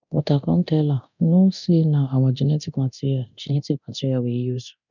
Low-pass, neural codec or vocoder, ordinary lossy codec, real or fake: 7.2 kHz; codec, 24 kHz, 0.9 kbps, DualCodec; none; fake